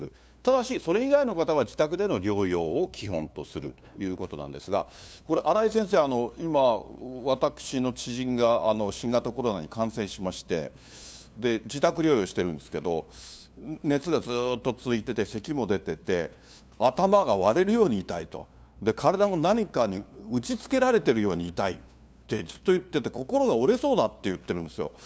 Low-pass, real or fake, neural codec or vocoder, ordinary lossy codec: none; fake; codec, 16 kHz, 2 kbps, FunCodec, trained on LibriTTS, 25 frames a second; none